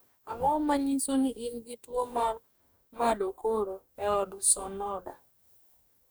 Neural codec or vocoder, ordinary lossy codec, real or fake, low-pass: codec, 44.1 kHz, 2.6 kbps, DAC; none; fake; none